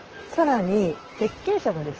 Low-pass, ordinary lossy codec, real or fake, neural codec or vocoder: 7.2 kHz; Opus, 16 kbps; fake; vocoder, 44.1 kHz, 128 mel bands, Pupu-Vocoder